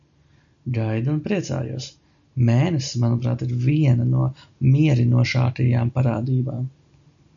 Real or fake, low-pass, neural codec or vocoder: real; 7.2 kHz; none